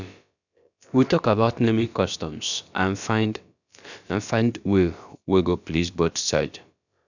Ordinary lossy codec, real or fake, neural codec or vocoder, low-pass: none; fake; codec, 16 kHz, about 1 kbps, DyCAST, with the encoder's durations; 7.2 kHz